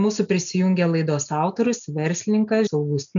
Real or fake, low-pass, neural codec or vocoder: real; 7.2 kHz; none